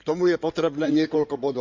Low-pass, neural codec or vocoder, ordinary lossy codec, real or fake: 7.2 kHz; codec, 16 kHz in and 24 kHz out, 2.2 kbps, FireRedTTS-2 codec; MP3, 64 kbps; fake